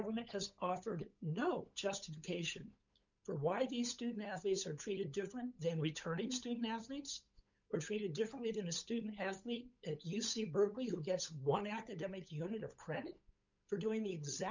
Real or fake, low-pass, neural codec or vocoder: fake; 7.2 kHz; codec, 16 kHz, 8 kbps, FunCodec, trained on LibriTTS, 25 frames a second